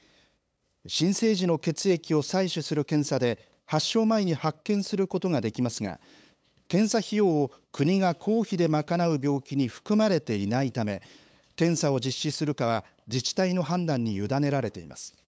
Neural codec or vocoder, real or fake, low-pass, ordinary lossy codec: codec, 16 kHz, 8 kbps, FunCodec, trained on LibriTTS, 25 frames a second; fake; none; none